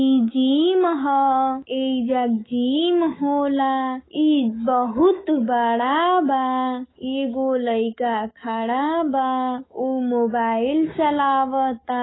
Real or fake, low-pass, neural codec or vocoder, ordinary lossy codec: fake; 7.2 kHz; autoencoder, 48 kHz, 128 numbers a frame, DAC-VAE, trained on Japanese speech; AAC, 16 kbps